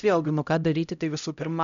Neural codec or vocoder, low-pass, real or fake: codec, 16 kHz, 0.5 kbps, X-Codec, HuBERT features, trained on LibriSpeech; 7.2 kHz; fake